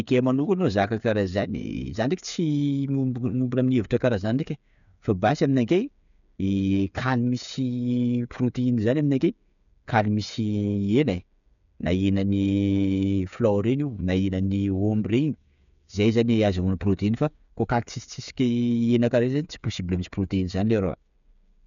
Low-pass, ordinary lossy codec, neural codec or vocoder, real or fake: 7.2 kHz; none; codec, 16 kHz, 8 kbps, FreqCodec, smaller model; fake